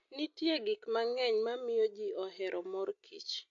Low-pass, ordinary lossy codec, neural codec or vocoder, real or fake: 7.2 kHz; AAC, 48 kbps; none; real